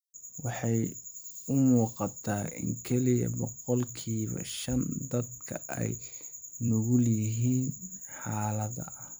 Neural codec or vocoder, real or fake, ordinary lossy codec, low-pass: vocoder, 44.1 kHz, 128 mel bands every 256 samples, BigVGAN v2; fake; none; none